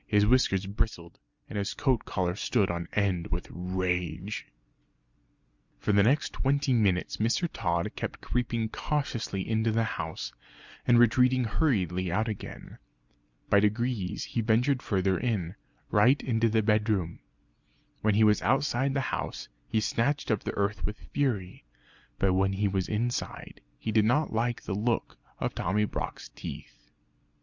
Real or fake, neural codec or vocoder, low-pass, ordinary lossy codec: real; none; 7.2 kHz; Opus, 64 kbps